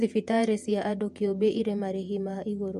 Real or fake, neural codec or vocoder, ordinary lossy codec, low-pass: fake; vocoder, 48 kHz, 128 mel bands, Vocos; MP3, 64 kbps; 14.4 kHz